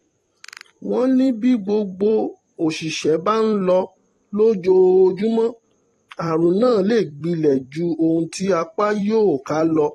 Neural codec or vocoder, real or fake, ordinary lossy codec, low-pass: vocoder, 44.1 kHz, 128 mel bands every 256 samples, BigVGAN v2; fake; AAC, 32 kbps; 19.8 kHz